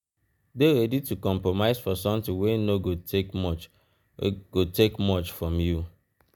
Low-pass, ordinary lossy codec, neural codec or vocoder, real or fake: none; none; none; real